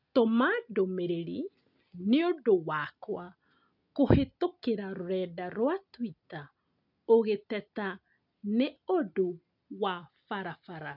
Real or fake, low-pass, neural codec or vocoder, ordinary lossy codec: real; 5.4 kHz; none; none